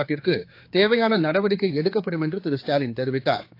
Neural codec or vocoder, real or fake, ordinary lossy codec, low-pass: codec, 16 kHz, 4 kbps, X-Codec, HuBERT features, trained on general audio; fake; AAC, 32 kbps; 5.4 kHz